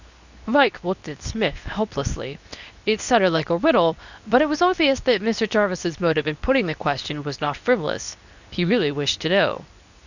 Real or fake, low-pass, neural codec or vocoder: fake; 7.2 kHz; codec, 24 kHz, 0.9 kbps, WavTokenizer, medium speech release version 2